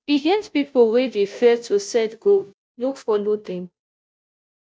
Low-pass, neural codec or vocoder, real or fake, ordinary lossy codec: none; codec, 16 kHz, 0.5 kbps, FunCodec, trained on Chinese and English, 25 frames a second; fake; none